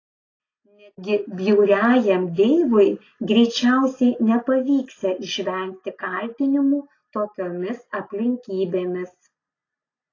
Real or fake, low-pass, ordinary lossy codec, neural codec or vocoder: real; 7.2 kHz; AAC, 32 kbps; none